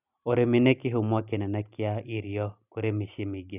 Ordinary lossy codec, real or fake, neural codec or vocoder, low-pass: none; real; none; 3.6 kHz